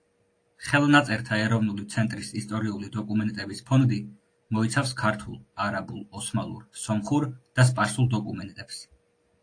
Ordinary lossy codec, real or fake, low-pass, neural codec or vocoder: AAC, 48 kbps; real; 9.9 kHz; none